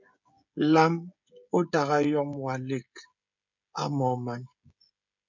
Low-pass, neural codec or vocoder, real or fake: 7.2 kHz; codec, 16 kHz, 16 kbps, FreqCodec, smaller model; fake